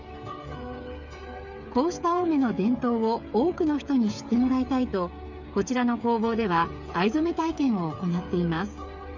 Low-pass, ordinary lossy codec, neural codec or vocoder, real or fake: 7.2 kHz; none; codec, 16 kHz, 8 kbps, FreqCodec, smaller model; fake